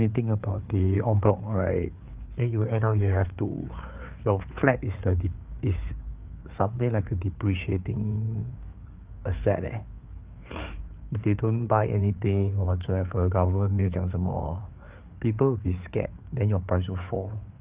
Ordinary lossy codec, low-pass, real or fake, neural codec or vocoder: Opus, 32 kbps; 3.6 kHz; fake; codec, 16 kHz, 4 kbps, FreqCodec, larger model